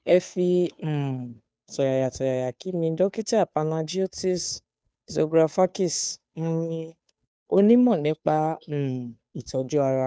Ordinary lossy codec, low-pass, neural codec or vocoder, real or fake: none; none; codec, 16 kHz, 2 kbps, FunCodec, trained on Chinese and English, 25 frames a second; fake